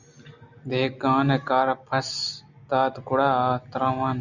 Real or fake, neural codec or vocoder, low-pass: real; none; 7.2 kHz